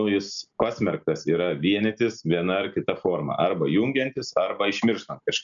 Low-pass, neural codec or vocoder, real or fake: 7.2 kHz; none; real